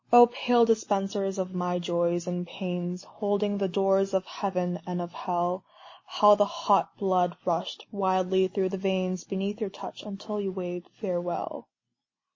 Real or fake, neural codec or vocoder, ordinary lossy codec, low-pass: real; none; MP3, 32 kbps; 7.2 kHz